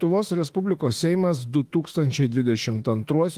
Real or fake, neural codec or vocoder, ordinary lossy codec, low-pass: fake; autoencoder, 48 kHz, 32 numbers a frame, DAC-VAE, trained on Japanese speech; Opus, 24 kbps; 14.4 kHz